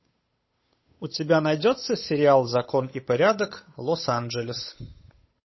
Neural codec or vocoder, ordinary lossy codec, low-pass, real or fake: codec, 16 kHz, 8 kbps, FunCodec, trained on Chinese and English, 25 frames a second; MP3, 24 kbps; 7.2 kHz; fake